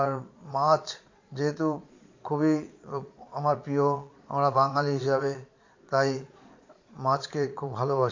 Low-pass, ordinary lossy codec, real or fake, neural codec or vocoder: 7.2 kHz; MP3, 48 kbps; fake; vocoder, 22.05 kHz, 80 mel bands, Vocos